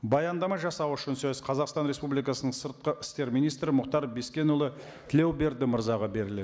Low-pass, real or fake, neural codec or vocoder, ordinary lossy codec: none; real; none; none